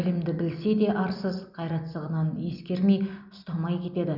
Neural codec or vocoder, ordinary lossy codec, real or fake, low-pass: none; none; real; 5.4 kHz